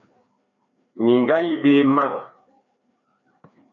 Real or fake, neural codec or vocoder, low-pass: fake; codec, 16 kHz, 2 kbps, FreqCodec, larger model; 7.2 kHz